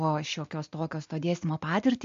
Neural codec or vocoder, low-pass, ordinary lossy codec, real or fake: none; 7.2 kHz; MP3, 48 kbps; real